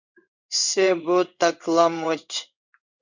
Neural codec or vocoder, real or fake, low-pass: codec, 16 kHz in and 24 kHz out, 1 kbps, XY-Tokenizer; fake; 7.2 kHz